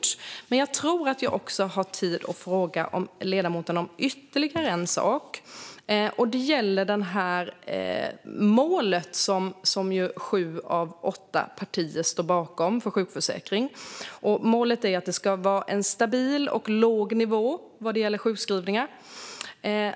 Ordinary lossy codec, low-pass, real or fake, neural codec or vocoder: none; none; real; none